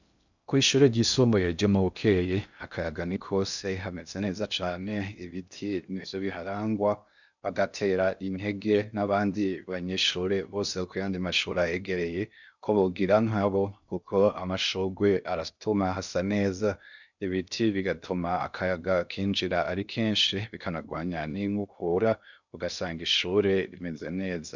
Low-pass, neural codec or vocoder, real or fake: 7.2 kHz; codec, 16 kHz in and 24 kHz out, 0.6 kbps, FocalCodec, streaming, 2048 codes; fake